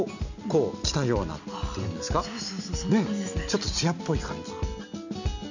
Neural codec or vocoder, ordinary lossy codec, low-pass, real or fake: none; none; 7.2 kHz; real